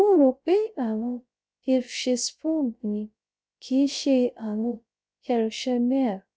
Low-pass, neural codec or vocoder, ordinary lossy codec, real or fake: none; codec, 16 kHz, 0.3 kbps, FocalCodec; none; fake